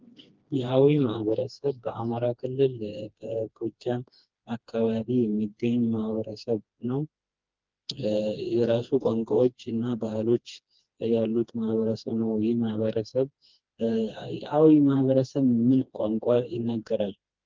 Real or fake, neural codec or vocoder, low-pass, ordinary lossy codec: fake; codec, 16 kHz, 2 kbps, FreqCodec, smaller model; 7.2 kHz; Opus, 32 kbps